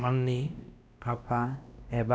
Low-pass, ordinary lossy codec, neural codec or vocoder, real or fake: none; none; codec, 16 kHz, 1 kbps, X-Codec, WavLM features, trained on Multilingual LibriSpeech; fake